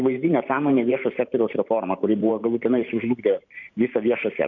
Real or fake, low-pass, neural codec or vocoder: fake; 7.2 kHz; vocoder, 44.1 kHz, 128 mel bands every 512 samples, BigVGAN v2